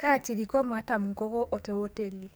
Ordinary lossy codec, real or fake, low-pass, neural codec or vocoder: none; fake; none; codec, 44.1 kHz, 2.6 kbps, SNAC